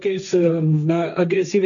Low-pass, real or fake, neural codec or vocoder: 7.2 kHz; fake; codec, 16 kHz, 1.1 kbps, Voila-Tokenizer